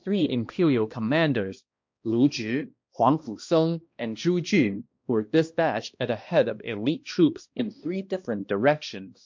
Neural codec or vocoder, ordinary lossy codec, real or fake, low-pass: codec, 16 kHz, 1 kbps, X-Codec, HuBERT features, trained on balanced general audio; MP3, 48 kbps; fake; 7.2 kHz